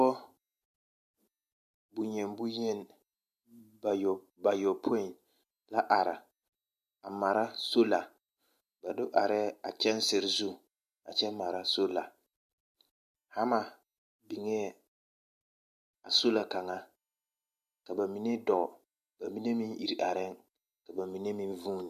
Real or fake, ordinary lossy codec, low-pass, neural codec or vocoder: real; MP3, 64 kbps; 14.4 kHz; none